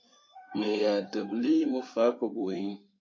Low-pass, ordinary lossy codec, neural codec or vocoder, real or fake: 7.2 kHz; MP3, 32 kbps; codec, 16 kHz in and 24 kHz out, 2.2 kbps, FireRedTTS-2 codec; fake